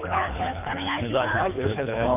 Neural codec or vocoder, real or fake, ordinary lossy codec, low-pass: codec, 24 kHz, 3 kbps, HILCodec; fake; none; 3.6 kHz